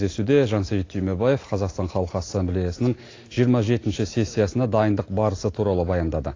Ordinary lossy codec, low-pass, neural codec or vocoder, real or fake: AAC, 32 kbps; 7.2 kHz; none; real